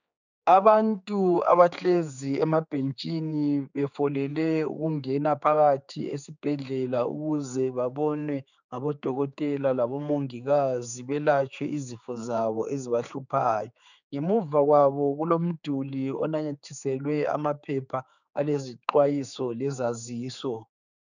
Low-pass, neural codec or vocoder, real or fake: 7.2 kHz; codec, 16 kHz, 4 kbps, X-Codec, HuBERT features, trained on general audio; fake